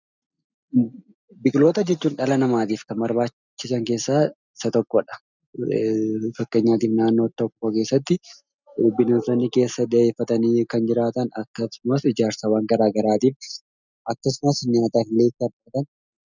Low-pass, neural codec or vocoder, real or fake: 7.2 kHz; none; real